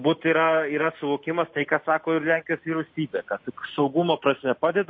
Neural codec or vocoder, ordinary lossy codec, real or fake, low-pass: none; MP3, 32 kbps; real; 7.2 kHz